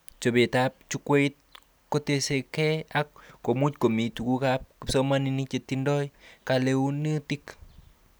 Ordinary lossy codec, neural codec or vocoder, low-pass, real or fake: none; none; none; real